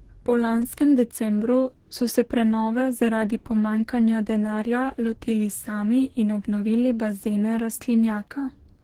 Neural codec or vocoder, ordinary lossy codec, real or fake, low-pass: codec, 44.1 kHz, 2.6 kbps, DAC; Opus, 16 kbps; fake; 19.8 kHz